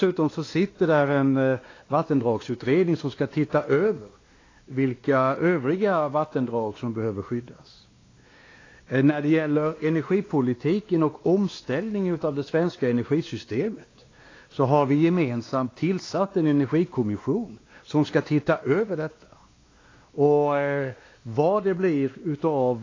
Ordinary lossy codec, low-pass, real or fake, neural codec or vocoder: AAC, 32 kbps; 7.2 kHz; fake; codec, 16 kHz, 2 kbps, X-Codec, WavLM features, trained on Multilingual LibriSpeech